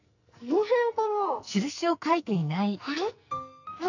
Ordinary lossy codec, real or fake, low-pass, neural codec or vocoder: none; fake; 7.2 kHz; codec, 44.1 kHz, 2.6 kbps, SNAC